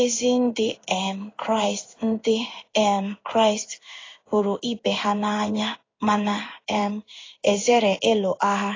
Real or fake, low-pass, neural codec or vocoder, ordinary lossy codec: fake; 7.2 kHz; codec, 16 kHz in and 24 kHz out, 1 kbps, XY-Tokenizer; AAC, 32 kbps